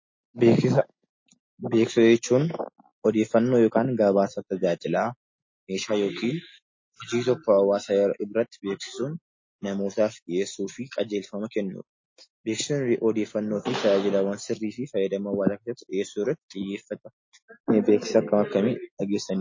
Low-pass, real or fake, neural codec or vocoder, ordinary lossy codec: 7.2 kHz; real; none; MP3, 32 kbps